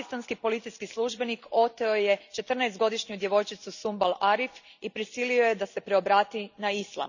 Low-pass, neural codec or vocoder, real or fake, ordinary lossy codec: 7.2 kHz; none; real; none